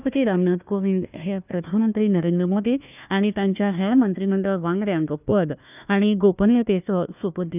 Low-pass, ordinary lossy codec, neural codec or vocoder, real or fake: 3.6 kHz; none; codec, 16 kHz, 1 kbps, FunCodec, trained on Chinese and English, 50 frames a second; fake